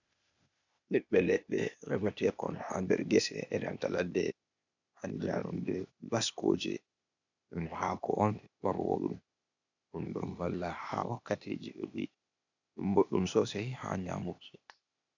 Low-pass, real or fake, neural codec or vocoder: 7.2 kHz; fake; codec, 16 kHz, 0.8 kbps, ZipCodec